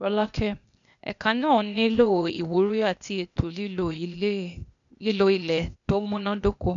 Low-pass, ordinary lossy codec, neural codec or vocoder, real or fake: 7.2 kHz; none; codec, 16 kHz, 0.8 kbps, ZipCodec; fake